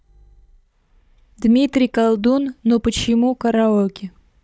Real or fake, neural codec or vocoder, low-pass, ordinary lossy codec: fake; codec, 16 kHz, 16 kbps, FunCodec, trained on Chinese and English, 50 frames a second; none; none